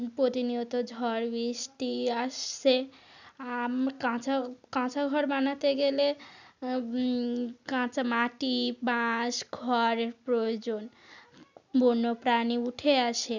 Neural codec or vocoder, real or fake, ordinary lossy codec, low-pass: none; real; none; 7.2 kHz